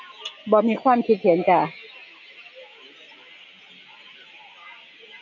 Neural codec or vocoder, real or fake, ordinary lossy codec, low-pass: none; real; none; 7.2 kHz